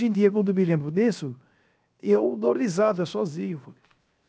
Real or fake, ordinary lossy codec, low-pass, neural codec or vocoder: fake; none; none; codec, 16 kHz, 0.7 kbps, FocalCodec